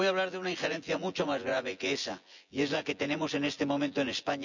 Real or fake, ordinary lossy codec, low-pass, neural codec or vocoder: fake; none; 7.2 kHz; vocoder, 24 kHz, 100 mel bands, Vocos